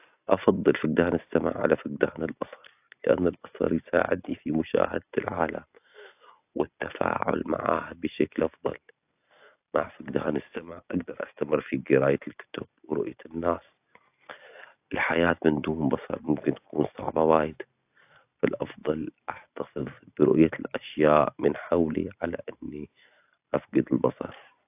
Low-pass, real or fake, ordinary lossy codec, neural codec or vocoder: 3.6 kHz; real; AAC, 32 kbps; none